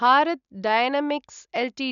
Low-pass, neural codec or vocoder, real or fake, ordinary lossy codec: 7.2 kHz; none; real; MP3, 96 kbps